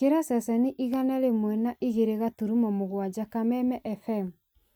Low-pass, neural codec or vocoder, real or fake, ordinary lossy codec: none; none; real; none